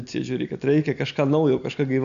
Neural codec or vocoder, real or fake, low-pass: none; real; 7.2 kHz